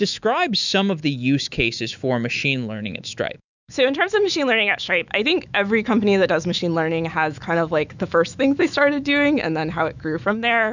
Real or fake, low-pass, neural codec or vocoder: fake; 7.2 kHz; autoencoder, 48 kHz, 128 numbers a frame, DAC-VAE, trained on Japanese speech